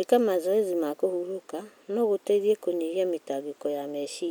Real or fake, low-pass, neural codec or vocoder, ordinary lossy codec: real; none; none; none